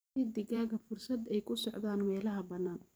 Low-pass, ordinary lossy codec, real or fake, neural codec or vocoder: none; none; fake; vocoder, 44.1 kHz, 128 mel bands every 512 samples, BigVGAN v2